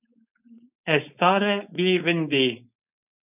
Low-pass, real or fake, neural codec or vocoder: 3.6 kHz; fake; codec, 16 kHz, 4.8 kbps, FACodec